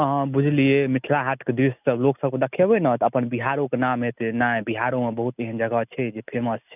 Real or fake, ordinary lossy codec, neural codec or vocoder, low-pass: real; none; none; 3.6 kHz